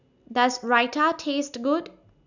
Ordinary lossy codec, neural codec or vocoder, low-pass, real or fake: none; none; 7.2 kHz; real